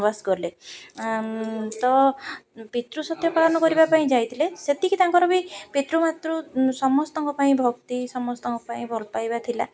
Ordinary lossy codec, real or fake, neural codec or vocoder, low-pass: none; real; none; none